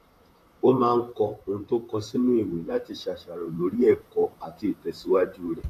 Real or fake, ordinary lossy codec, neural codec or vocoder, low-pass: fake; AAC, 64 kbps; vocoder, 44.1 kHz, 128 mel bands, Pupu-Vocoder; 14.4 kHz